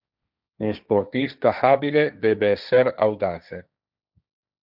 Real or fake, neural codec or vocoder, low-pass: fake; codec, 16 kHz, 1.1 kbps, Voila-Tokenizer; 5.4 kHz